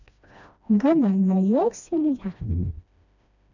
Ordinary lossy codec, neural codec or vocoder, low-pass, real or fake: none; codec, 16 kHz, 1 kbps, FreqCodec, smaller model; 7.2 kHz; fake